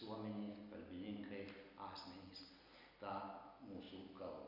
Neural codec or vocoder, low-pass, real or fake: none; 5.4 kHz; real